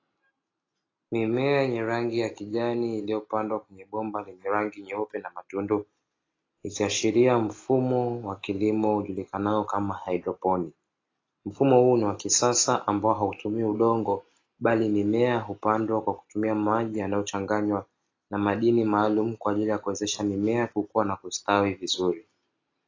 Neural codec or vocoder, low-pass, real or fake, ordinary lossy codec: none; 7.2 kHz; real; AAC, 32 kbps